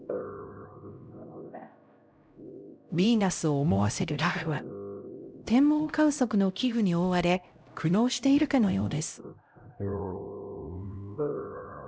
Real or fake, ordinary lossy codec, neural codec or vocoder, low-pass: fake; none; codec, 16 kHz, 0.5 kbps, X-Codec, HuBERT features, trained on LibriSpeech; none